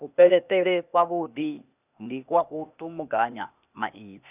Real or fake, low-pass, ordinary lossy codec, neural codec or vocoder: fake; 3.6 kHz; none; codec, 16 kHz, 0.8 kbps, ZipCodec